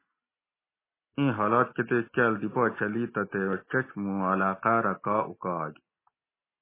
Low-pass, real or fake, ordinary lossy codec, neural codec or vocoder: 3.6 kHz; real; MP3, 16 kbps; none